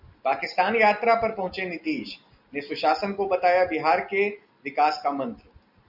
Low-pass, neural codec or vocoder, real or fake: 5.4 kHz; none; real